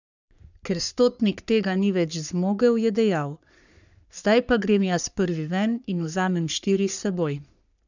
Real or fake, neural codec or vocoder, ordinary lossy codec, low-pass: fake; codec, 44.1 kHz, 3.4 kbps, Pupu-Codec; none; 7.2 kHz